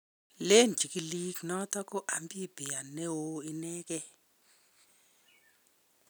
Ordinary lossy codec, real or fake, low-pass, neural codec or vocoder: none; real; none; none